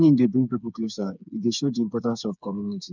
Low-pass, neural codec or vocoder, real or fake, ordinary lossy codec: 7.2 kHz; codec, 16 kHz, 4 kbps, FreqCodec, smaller model; fake; none